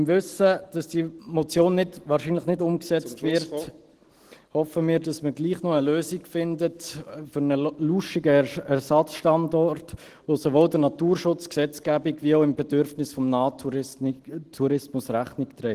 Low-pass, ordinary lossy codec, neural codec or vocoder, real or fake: 14.4 kHz; Opus, 16 kbps; none; real